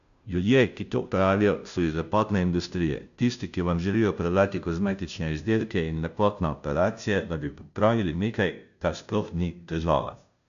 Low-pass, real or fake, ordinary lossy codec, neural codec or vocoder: 7.2 kHz; fake; none; codec, 16 kHz, 0.5 kbps, FunCodec, trained on Chinese and English, 25 frames a second